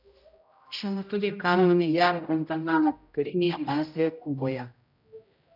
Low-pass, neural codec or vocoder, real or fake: 5.4 kHz; codec, 16 kHz, 0.5 kbps, X-Codec, HuBERT features, trained on general audio; fake